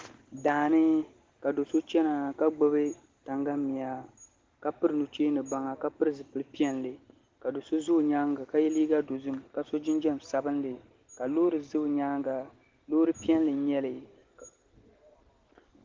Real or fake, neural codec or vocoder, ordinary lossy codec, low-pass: real; none; Opus, 16 kbps; 7.2 kHz